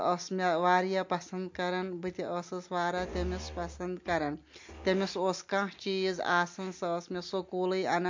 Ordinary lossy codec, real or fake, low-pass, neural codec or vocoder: MP3, 48 kbps; real; 7.2 kHz; none